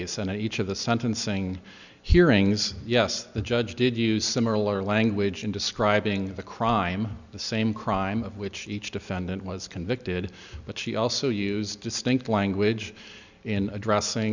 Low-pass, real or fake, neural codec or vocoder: 7.2 kHz; real; none